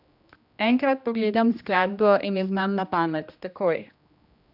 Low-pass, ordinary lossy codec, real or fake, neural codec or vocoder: 5.4 kHz; none; fake; codec, 16 kHz, 1 kbps, X-Codec, HuBERT features, trained on general audio